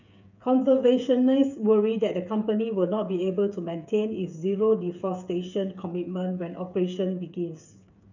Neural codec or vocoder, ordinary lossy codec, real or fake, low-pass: codec, 16 kHz, 8 kbps, FreqCodec, smaller model; none; fake; 7.2 kHz